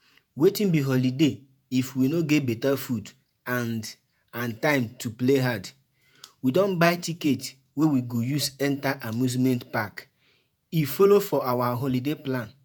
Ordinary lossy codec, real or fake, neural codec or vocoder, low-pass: none; fake; vocoder, 48 kHz, 128 mel bands, Vocos; none